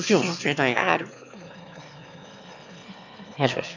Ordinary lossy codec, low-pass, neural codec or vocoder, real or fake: none; 7.2 kHz; autoencoder, 22.05 kHz, a latent of 192 numbers a frame, VITS, trained on one speaker; fake